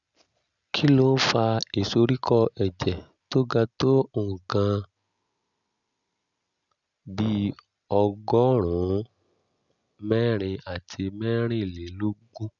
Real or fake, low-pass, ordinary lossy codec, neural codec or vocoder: real; 7.2 kHz; none; none